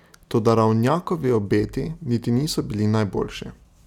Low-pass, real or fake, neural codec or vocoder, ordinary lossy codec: 19.8 kHz; real; none; none